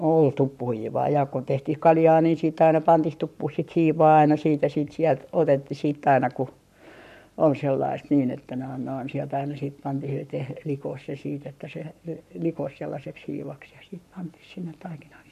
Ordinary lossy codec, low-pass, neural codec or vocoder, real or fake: none; 14.4 kHz; codec, 44.1 kHz, 7.8 kbps, Pupu-Codec; fake